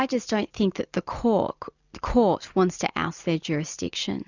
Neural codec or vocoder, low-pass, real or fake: none; 7.2 kHz; real